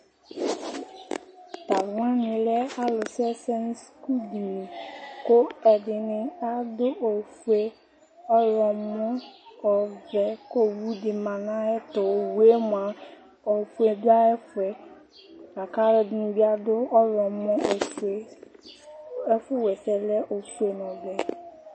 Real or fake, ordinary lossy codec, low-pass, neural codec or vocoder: real; MP3, 32 kbps; 9.9 kHz; none